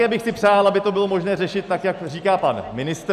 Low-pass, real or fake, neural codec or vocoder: 14.4 kHz; real; none